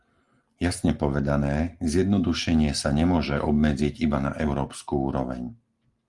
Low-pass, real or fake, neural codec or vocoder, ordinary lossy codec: 10.8 kHz; real; none; Opus, 24 kbps